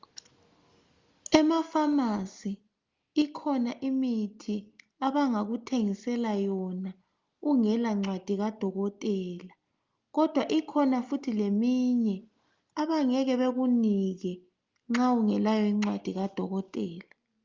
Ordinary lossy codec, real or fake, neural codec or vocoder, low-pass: Opus, 32 kbps; real; none; 7.2 kHz